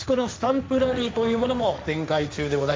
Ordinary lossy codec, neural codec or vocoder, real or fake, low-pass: none; codec, 16 kHz, 1.1 kbps, Voila-Tokenizer; fake; none